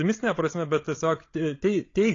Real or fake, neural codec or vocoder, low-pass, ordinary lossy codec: fake; codec, 16 kHz, 16 kbps, FreqCodec, larger model; 7.2 kHz; AAC, 32 kbps